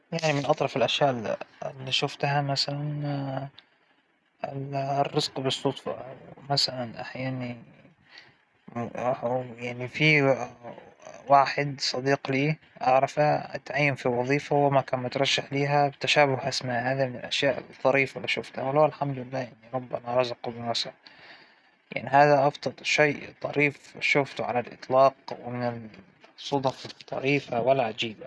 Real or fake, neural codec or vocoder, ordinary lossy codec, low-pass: real; none; none; none